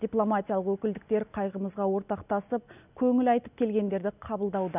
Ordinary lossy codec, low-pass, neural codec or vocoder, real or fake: none; 3.6 kHz; none; real